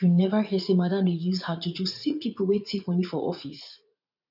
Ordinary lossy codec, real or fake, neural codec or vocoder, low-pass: none; fake; vocoder, 44.1 kHz, 80 mel bands, Vocos; 5.4 kHz